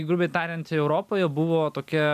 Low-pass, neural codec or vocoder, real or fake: 14.4 kHz; none; real